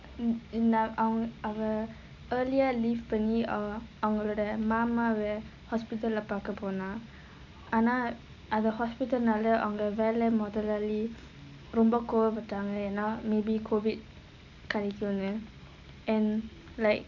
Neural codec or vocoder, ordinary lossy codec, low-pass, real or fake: none; none; 7.2 kHz; real